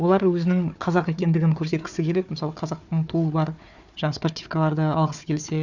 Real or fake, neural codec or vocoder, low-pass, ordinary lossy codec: fake; codec, 16 kHz, 4 kbps, FunCodec, trained on Chinese and English, 50 frames a second; 7.2 kHz; none